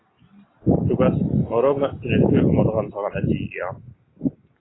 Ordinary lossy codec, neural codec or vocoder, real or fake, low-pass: AAC, 16 kbps; none; real; 7.2 kHz